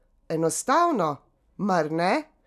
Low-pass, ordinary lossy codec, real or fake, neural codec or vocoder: 14.4 kHz; none; real; none